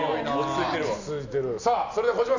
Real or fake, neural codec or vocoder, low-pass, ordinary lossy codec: real; none; 7.2 kHz; none